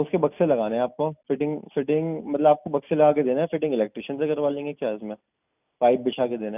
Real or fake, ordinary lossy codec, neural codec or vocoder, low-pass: real; none; none; 3.6 kHz